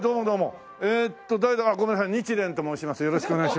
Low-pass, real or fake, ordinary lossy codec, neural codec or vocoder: none; real; none; none